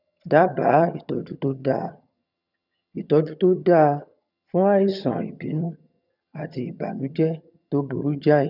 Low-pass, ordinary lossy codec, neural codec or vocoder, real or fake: 5.4 kHz; none; vocoder, 22.05 kHz, 80 mel bands, HiFi-GAN; fake